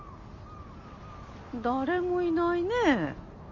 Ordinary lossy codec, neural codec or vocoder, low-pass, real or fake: none; none; 7.2 kHz; real